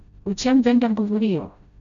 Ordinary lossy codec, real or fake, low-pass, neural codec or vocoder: none; fake; 7.2 kHz; codec, 16 kHz, 0.5 kbps, FreqCodec, smaller model